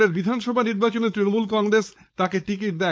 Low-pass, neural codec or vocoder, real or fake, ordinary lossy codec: none; codec, 16 kHz, 4.8 kbps, FACodec; fake; none